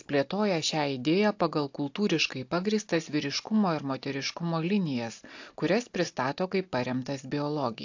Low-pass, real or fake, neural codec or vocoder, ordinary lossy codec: 7.2 kHz; real; none; AAC, 48 kbps